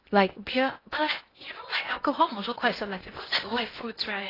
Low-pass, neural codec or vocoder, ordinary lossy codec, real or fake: 5.4 kHz; codec, 16 kHz in and 24 kHz out, 0.8 kbps, FocalCodec, streaming, 65536 codes; AAC, 24 kbps; fake